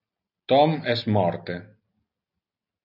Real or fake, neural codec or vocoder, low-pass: real; none; 5.4 kHz